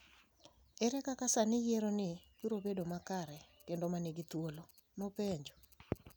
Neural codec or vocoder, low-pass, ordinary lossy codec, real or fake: none; none; none; real